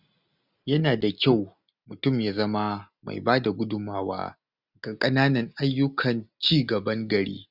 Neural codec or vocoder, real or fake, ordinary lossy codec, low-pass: none; real; none; 5.4 kHz